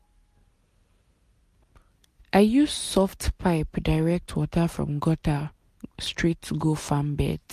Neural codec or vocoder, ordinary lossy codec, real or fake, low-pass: none; MP3, 64 kbps; real; 14.4 kHz